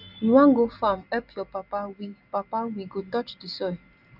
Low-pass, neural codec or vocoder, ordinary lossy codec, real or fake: 5.4 kHz; none; none; real